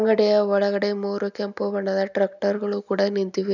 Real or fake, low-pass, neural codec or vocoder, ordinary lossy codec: real; 7.2 kHz; none; none